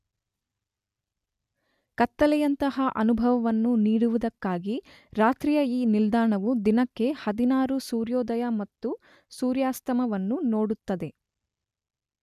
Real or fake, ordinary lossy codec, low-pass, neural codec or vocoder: real; none; 14.4 kHz; none